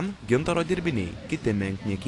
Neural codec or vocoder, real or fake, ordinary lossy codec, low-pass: none; real; AAC, 48 kbps; 10.8 kHz